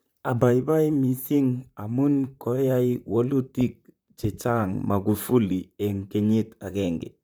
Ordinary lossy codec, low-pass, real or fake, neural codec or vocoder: none; none; fake; vocoder, 44.1 kHz, 128 mel bands, Pupu-Vocoder